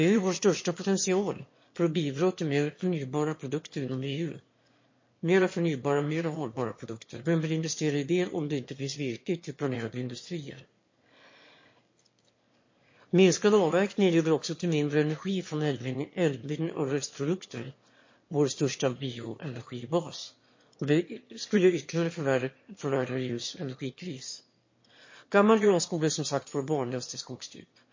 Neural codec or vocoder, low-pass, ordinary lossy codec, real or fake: autoencoder, 22.05 kHz, a latent of 192 numbers a frame, VITS, trained on one speaker; 7.2 kHz; MP3, 32 kbps; fake